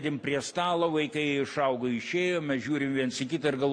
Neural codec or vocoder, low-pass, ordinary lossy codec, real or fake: none; 9.9 kHz; MP3, 64 kbps; real